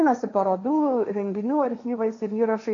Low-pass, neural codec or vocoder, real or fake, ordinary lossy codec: 7.2 kHz; codec, 16 kHz, 1.1 kbps, Voila-Tokenizer; fake; AAC, 48 kbps